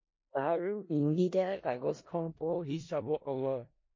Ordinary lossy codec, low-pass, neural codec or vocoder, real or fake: MP3, 32 kbps; 7.2 kHz; codec, 16 kHz in and 24 kHz out, 0.4 kbps, LongCat-Audio-Codec, four codebook decoder; fake